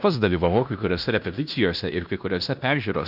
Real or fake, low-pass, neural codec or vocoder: fake; 5.4 kHz; codec, 16 kHz in and 24 kHz out, 0.9 kbps, LongCat-Audio-Codec, fine tuned four codebook decoder